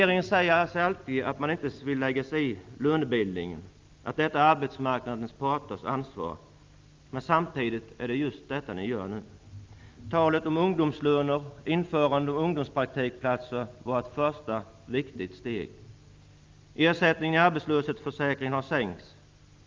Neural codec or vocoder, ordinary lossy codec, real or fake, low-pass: none; Opus, 24 kbps; real; 7.2 kHz